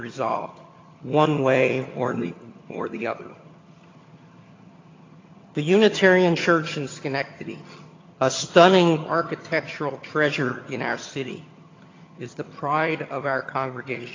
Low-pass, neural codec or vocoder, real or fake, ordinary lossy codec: 7.2 kHz; vocoder, 22.05 kHz, 80 mel bands, HiFi-GAN; fake; AAC, 32 kbps